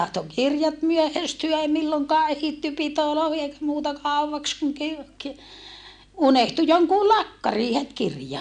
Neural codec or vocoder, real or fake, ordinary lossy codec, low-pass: none; real; none; 9.9 kHz